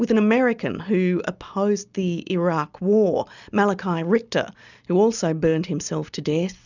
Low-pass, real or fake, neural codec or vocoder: 7.2 kHz; real; none